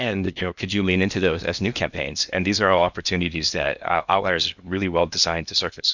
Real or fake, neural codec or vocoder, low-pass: fake; codec, 16 kHz in and 24 kHz out, 0.8 kbps, FocalCodec, streaming, 65536 codes; 7.2 kHz